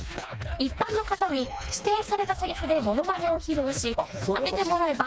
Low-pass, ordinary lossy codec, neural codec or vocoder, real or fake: none; none; codec, 16 kHz, 2 kbps, FreqCodec, smaller model; fake